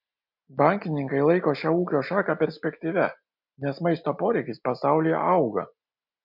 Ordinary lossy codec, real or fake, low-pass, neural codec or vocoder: MP3, 48 kbps; real; 5.4 kHz; none